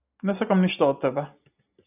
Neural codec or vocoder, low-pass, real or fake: none; 3.6 kHz; real